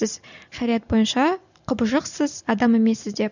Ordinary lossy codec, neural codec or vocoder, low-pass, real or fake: none; none; 7.2 kHz; real